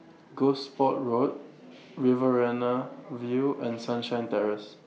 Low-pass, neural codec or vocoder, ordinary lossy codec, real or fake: none; none; none; real